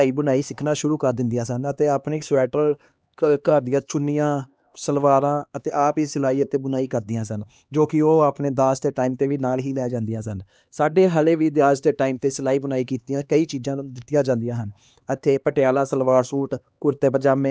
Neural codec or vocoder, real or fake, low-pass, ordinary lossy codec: codec, 16 kHz, 2 kbps, X-Codec, HuBERT features, trained on LibriSpeech; fake; none; none